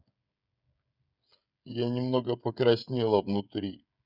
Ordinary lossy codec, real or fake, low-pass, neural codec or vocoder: none; fake; 5.4 kHz; codec, 16 kHz, 16 kbps, FreqCodec, smaller model